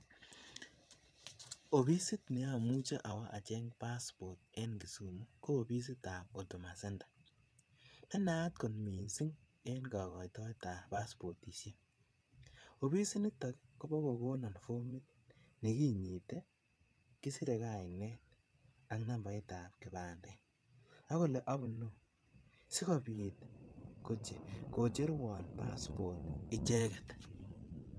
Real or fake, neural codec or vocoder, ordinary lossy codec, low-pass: fake; vocoder, 22.05 kHz, 80 mel bands, Vocos; none; none